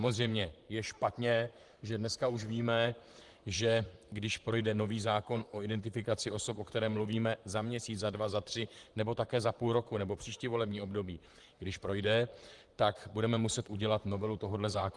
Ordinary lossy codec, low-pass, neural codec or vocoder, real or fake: Opus, 24 kbps; 10.8 kHz; vocoder, 44.1 kHz, 128 mel bands, Pupu-Vocoder; fake